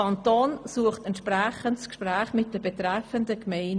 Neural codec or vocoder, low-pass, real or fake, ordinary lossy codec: none; 9.9 kHz; real; none